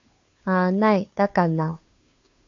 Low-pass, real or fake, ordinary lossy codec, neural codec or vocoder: 7.2 kHz; fake; AAC, 48 kbps; codec, 16 kHz, 2 kbps, FunCodec, trained on Chinese and English, 25 frames a second